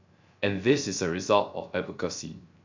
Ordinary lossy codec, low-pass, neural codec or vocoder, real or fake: MP3, 64 kbps; 7.2 kHz; codec, 16 kHz, 0.3 kbps, FocalCodec; fake